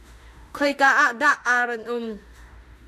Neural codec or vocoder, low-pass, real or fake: autoencoder, 48 kHz, 32 numbers a frame, DAC-VAE, trained on Japanese speech; 14.4 kHz; fake